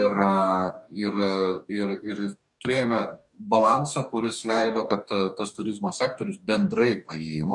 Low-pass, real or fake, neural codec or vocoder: 10.8 kHz; fake; codec, 44.1 kHz, 2.6 kbps, DAC